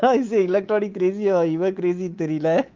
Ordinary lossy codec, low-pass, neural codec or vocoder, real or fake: Opus, 24 kbps; 7.2 kHz; codec, 24 kHz, 3.1 kbps, DualCodec; fake